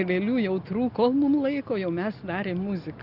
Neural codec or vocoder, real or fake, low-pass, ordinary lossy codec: none; real; 5.4 kHz; Opus, 64 kbps